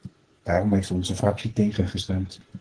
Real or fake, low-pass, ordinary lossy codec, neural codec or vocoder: fake; 9.9 kHz; Opus, 16 kbps; codec, 44.1 kHz, 2.6 kbps, SNAC